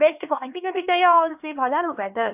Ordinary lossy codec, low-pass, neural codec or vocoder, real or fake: AAC, 32 kbps; 3.6 kHz; codec, 16 kHz, 2 kbps, X-Codec, HuBERT features, trained on LibriSpeech; fake